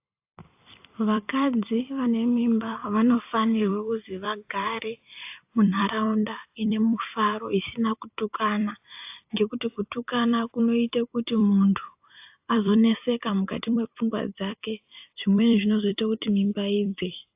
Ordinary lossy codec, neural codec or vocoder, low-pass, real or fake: AAC, 32 kbps; vocoder, 44.1 kHz, 128 mel bands every 256 samples, BigVGAN v2; 3.6 kHz; fake